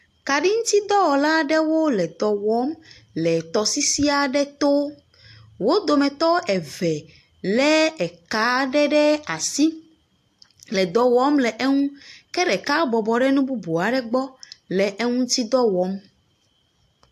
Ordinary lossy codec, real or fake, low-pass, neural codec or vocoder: AAC, 64 kbps; real; 14.4 kHz; none